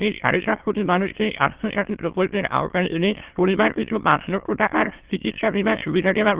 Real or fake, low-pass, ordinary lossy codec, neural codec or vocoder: fake; 3.6 kHz; Opus, 16 kbps; autoencoder, 22.05 kHz, a latent of 192 numbers a frame, VITS, trained on many speakers